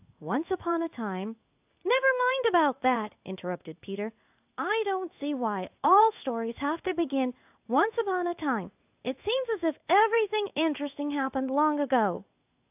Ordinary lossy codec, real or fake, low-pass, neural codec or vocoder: AAC, 32 kbps; fake; 3.6 kHz; codec, 16 kHz in and 24 kHz out, 1 kbps, XY-Tokenizer